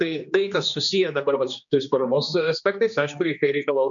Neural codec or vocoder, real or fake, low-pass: codec, 16 kHz, 2 kbps, X-Codec, HuBERT features, trained on general audio; fake; 7.2 kHz